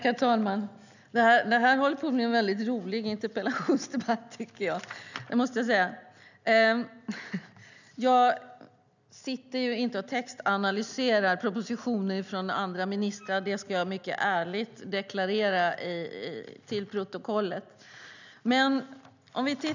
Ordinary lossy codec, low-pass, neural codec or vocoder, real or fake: none; 7.2 kHz; none; real